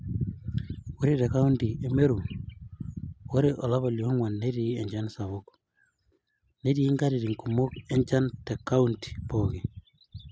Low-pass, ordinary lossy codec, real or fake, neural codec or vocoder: none; none; real; none